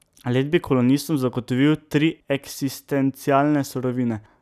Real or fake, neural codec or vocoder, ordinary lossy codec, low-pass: real; none; none; 14.4 kHz